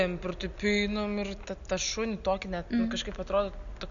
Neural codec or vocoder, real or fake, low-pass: none; real; 7.2 kHz